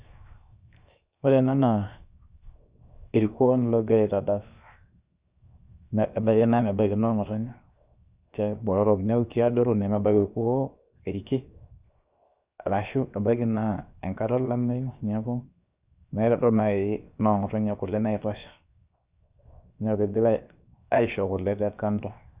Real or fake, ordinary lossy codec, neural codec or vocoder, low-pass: fake; Opus, 64 kbps; codec, 16 kHz, 0.7 kbps, FocalCodec; 3.6 kHz